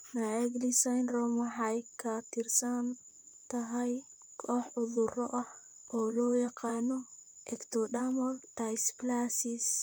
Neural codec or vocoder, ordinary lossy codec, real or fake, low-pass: vocoder, 44.1 kHz, 128 mel bands, Pupu-Vocoder; none; fake; none